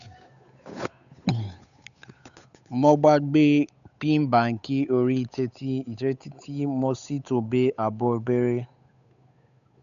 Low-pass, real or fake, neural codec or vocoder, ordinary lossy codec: 7.2 kHz; fake; codec, 16 kHz, 8 kbps, FunCodec, trained on Chinese and English, 25 frames a second; none